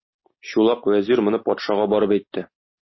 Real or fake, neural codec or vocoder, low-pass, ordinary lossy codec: real; none; 7.2 kHz; MP3, 24 kbps